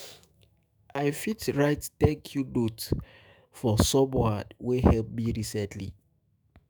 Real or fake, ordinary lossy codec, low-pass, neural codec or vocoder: fake; none; none; autoencoder, 48 kHz, 128 numbers a frame, DAC-VAE, trained on Japanese speech